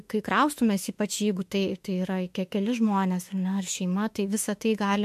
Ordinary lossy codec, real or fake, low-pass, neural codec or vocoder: MP3, 64 kbps; fake; 14.4 kHz; autoencoder, 48 kHz, 32 numbers a frame, DAC-VAE, trained on Japanese speech